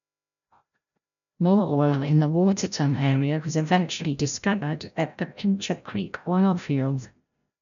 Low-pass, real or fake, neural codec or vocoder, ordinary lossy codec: 7.2 kHz; fake; codec, 16 kHz, 0.5 kbps, FreqCodec, larger model; none